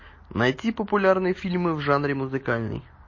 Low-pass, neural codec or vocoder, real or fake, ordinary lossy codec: 7.2 kHz; none; real; MP3, 32 kbps